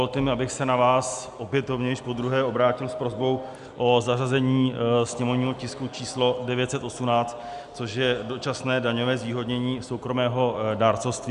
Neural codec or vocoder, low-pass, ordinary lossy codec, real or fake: none; 10.8 kHz; AAC, 96 kbps; real